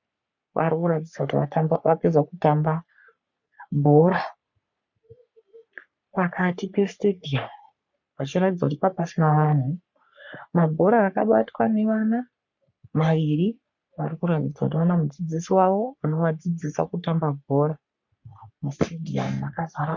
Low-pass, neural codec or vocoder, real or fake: 7.2 kHz; codec, 44.1 kHz, 3.4 kbps, Pupu-Codec; fake